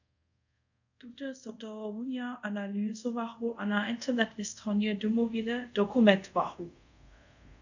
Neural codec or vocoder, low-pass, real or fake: codec, 24 kHz, 0.5 kbps, DualCodec; 7.2 kHz; fake